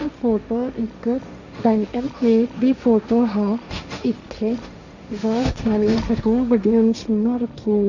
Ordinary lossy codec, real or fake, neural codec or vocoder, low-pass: none; fake; codec, 16 kHz, 1.1 kbps, Voila-Tokenizer; 7.2 kHz